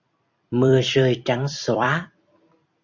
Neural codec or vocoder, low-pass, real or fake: vocoder, 44.1 kHz, 128 mel bands every 256 samples, BigVGAN v2; 7.2 kHz; fake